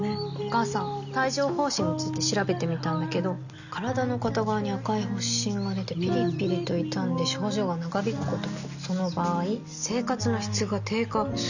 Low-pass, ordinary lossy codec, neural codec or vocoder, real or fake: 7.2 kHz; none; none; real